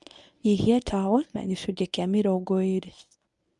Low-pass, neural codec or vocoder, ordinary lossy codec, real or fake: 10.8 kHz; codec, 24 kHz, 0.9 kbps, WavTokenizer, medium speech release version 1; none; fake